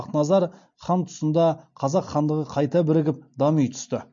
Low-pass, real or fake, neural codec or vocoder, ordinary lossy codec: 7.2 kHz; real; none; MP3, 48 kbps